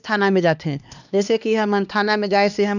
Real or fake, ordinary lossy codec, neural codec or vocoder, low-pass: fake; none; codec, 16 kHz, 2 kbps, X-Codec, HuBERT features, trained on LibriSpeech; 7.2 kHz